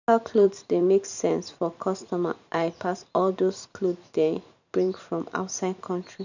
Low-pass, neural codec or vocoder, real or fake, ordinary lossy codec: 7.2 kHz; vocoder, 44.1 kHz, 128 mel bands every 512 samples, BigVGAN v2; fake; none